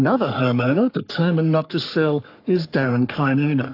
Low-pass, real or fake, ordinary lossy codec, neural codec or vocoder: 5.4 kHz; fake; AAC, 32 kbps; codec, 44.1 kHz, 3.4 kbps, Pupu-Codec